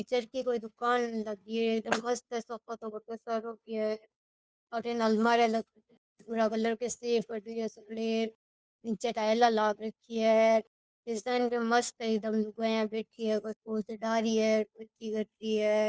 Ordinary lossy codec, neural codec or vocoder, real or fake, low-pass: none; codec, 16 kHz, 2 kbps, FunCodec, trained on Chinese and English, 25 frames a second; fake; none